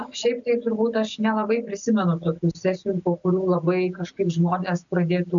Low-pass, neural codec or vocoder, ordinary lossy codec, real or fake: 7.2 kHz; none; MP3, 96 kbps; real